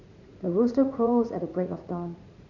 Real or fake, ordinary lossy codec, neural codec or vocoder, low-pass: fake; none; vocoder, 44.1 kHz, 80 mel bands, Vocos; 7.2 kHz